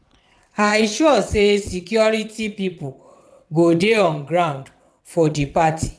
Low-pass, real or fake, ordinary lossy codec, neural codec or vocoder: none; fake; none; vocoder, 22.05 kHz, 80 mel bands, WaveNeXt